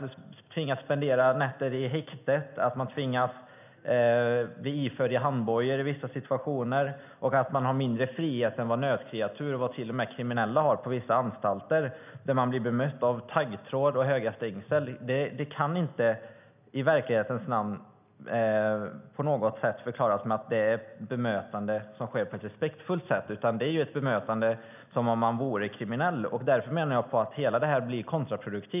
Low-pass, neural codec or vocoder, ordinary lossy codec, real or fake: 3.6 kHz; none; none; real